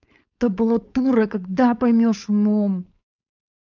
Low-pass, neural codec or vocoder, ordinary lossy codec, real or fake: 7.2 kHz; codec, 16 kHz, 4.8 kbps, FACodec; none; fake